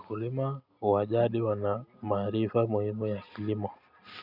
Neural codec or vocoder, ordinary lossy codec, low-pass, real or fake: none; none; 5.4 kHz; real